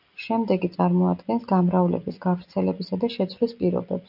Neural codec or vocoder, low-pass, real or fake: none; 5.4 kHz; real